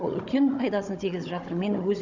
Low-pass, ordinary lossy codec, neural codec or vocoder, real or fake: 7.2 kHz; none; codec, 16 kHz, 16 kbps, FunCodec, trained on Chinese and English, 50 frames a second; fake